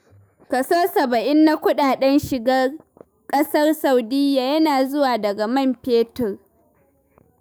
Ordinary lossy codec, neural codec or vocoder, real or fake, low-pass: none; autoencoder, 48 kHz, 128 numbers a frame, DAC-VAE, trained on Japanese speech; fake; none